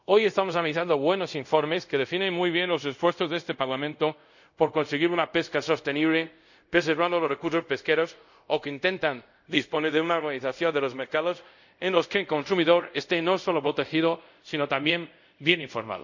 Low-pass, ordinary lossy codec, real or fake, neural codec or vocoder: 7.2 kHz; none; fake; codec, 24 kHz, 0.5 kbps, DualCodec